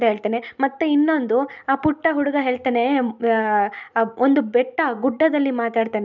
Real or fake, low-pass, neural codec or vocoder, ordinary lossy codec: real; 7.2 kHz; none; none